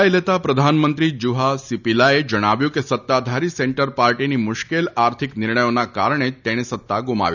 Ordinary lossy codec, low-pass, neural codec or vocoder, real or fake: none; 7.2 kHz; none; real